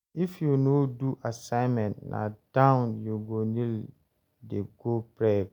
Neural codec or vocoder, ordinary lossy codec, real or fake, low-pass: none; none; real; 19.8 kHz